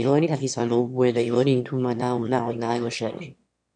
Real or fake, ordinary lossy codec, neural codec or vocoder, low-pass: fake; MP3, 64 kbps; autoencoder, 22.05 kHz, a latent of 192 numbers a frame, VITS, trained on one speaker; 9.9 kHz